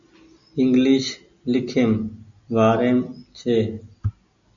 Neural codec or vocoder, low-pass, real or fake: none; 7.2 kHz; real